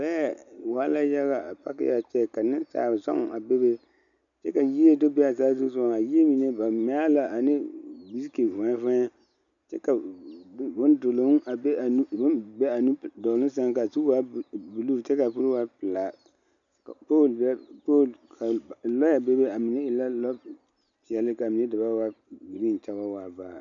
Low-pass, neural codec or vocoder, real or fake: 7.2 kHz; none; real